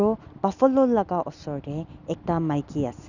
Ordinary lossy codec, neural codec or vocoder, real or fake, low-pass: none; none; real; 7.2 kHz